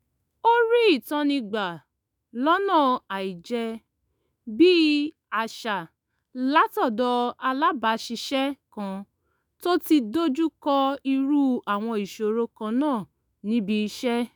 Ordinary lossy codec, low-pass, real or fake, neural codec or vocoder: none; none; fake; autoencoder, 48 kHz, 128 numbers a frame, DAC-VAE, trained on Japanese speech